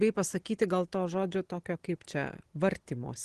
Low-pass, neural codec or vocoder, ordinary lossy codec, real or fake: 10.8 kHz; none; Opus, 16 kbps; real